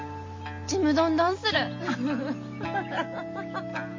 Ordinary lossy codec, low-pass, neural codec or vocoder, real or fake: MP3, 32 kbps; 7.2 kHz; none; real